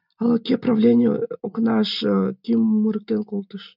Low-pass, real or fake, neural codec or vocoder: 5.4 kHz; real; none